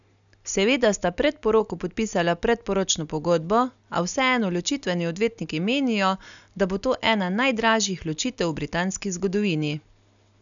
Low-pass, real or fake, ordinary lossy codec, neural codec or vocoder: 7.2 kHz; real; none; none